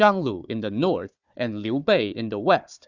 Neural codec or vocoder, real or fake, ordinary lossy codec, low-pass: codec, 16 kHz, 4 kbps, FunCodec, trained on Chinese and English, 50 frames a second; fake; Opus, 64 kbps; 7.2 kHz